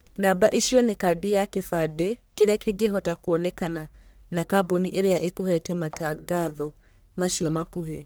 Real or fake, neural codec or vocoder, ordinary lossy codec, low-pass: fake; codec, 44.1 kHz, 1.7 kbps, Pupu-Codec; none; none